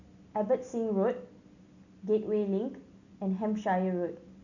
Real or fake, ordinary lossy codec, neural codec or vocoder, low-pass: real; none; none; 7.2 kHz